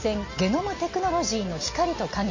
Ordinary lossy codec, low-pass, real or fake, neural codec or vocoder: MP3, 32 kbps; 7.2 kHz; real; none